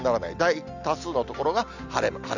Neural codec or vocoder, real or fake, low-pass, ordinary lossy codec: none; real; 7.2 kHz; none